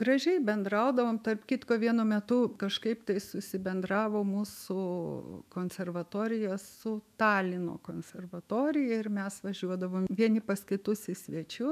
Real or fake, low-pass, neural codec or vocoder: fake; 14.4 kHz; autoencoder, 48 kHz, 128 numbers a frame, DAC-VAE, trained on Japanese speech